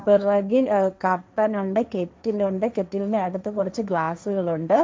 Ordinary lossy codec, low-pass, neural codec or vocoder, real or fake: none; none; codec, 16 kHz, 1.1 kbps, Voila-Tokenizer; fake